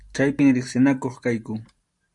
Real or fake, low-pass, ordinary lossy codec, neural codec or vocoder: fake; 10.8 kHz; MP3, 96 kbps; vocoder, 44.1 kHz, 128 mel bands every 512 samples, BigVGAN v2